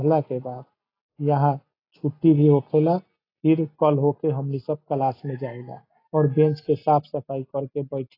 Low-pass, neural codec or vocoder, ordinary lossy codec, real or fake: 5.4 kHz; none; AAC, 24 kbps; real